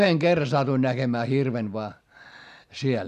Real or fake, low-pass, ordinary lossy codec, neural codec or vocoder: real; 14.4 kHz; none; none